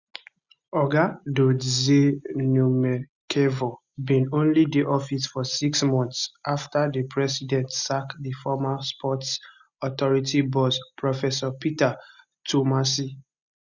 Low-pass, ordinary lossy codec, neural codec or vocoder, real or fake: 7.2 kHz; Opus, 64 kbps; none; real